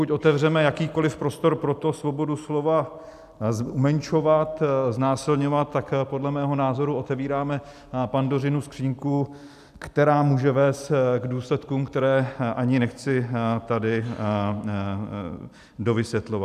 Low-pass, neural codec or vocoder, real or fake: 14.4 kHz; none; real